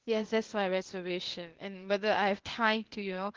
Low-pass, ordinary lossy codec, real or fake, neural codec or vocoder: 7.2 kHz; Opus, 16 kbps; fake; codec, 16 kHz, 0.8 kbps, ZipCodec